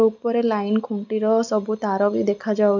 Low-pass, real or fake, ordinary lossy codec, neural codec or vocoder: 7.2 kHz; real; none; none